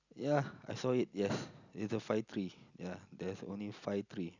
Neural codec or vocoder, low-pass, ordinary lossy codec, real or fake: none; 7.2 kHz; none; real